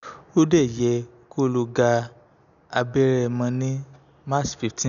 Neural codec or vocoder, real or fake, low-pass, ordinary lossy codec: none; real; 7.2 kHz; none